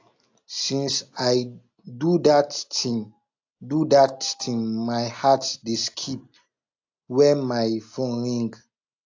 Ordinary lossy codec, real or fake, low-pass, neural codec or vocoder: AAC, 48 kbps; real; 7.2 kHz; none